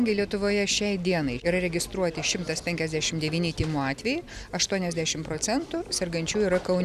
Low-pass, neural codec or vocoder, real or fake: 14.4 kHz; none; real